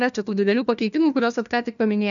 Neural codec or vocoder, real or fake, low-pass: codec, 16 kHz, 1 kbps, FunCodec, trained on LibriTTS, 50 frames a second; fake; 7.2 kHz